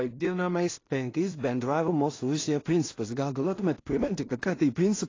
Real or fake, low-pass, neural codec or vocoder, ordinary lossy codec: fake; 7.2 kHz; codec, 16 kHz in and 24 kHz out, 0.4 kbps, LongCat-Audio-Codec, two codebook decoder; AAC, 32 kbps